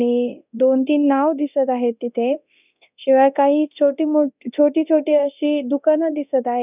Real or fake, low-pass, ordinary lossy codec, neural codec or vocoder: fake; 3.6 kHz; none; codec, 24 kHz, 0.9 kbps, DualCodec